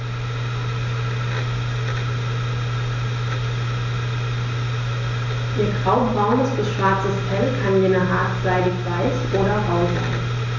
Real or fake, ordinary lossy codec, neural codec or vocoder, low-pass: real; none; none; 7.2 kHz